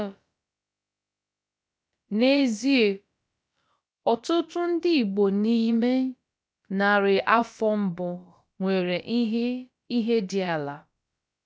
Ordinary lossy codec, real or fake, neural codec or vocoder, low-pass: none; fake; codec, 16 kHz, about 1 kbps, DyCAST, with the encoder's durations; none